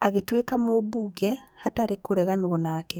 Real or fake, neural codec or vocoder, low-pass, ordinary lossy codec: fake; codec, 44.1 kHz, 2.6 kbps, SNAC; none; none